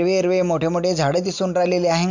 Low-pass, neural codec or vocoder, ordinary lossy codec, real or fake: 7.2 kHz; none; none; real